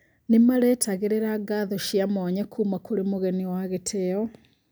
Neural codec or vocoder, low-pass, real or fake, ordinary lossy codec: none; none; real; none